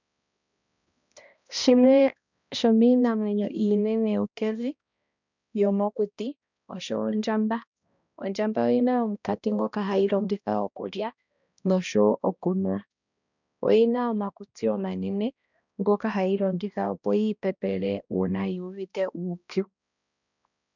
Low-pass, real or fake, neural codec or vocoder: 7.2 kHz; fake; codec, 16 kHz, 1 kbps, X-Codec, HuBERT features, trained on balanced general audio